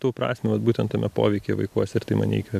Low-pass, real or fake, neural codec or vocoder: 14.4 kHz; real; none